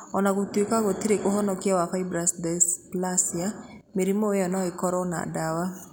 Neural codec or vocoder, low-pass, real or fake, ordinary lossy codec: none; 19.8 kHz; real; none